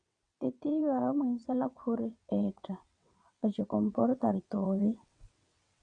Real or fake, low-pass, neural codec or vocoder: fake; 9.9 kHz; vocoder, 22.05 kHz, 80 mel bands, WaveNeXt